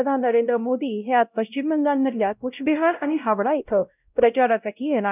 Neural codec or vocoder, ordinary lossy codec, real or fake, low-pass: codec, 16 kHz, 0.5 kbps, X-Codec, WavLM features, trained on Multilingual LibriSpeech; none; fake; 3.6 kHz